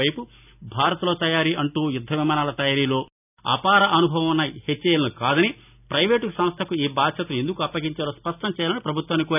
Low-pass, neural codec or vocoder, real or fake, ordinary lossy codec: 3.6 kHz; none; real; none